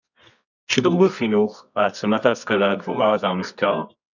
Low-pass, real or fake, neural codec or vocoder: 7.2 kHz; fake; codec, 24 kHz, 0.9 kbps, WavTokenizer, medium music audio release